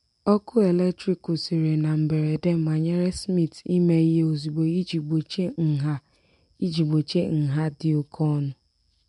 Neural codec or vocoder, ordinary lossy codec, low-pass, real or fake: none; MP3, 64 kbps; 10.8 kHz; real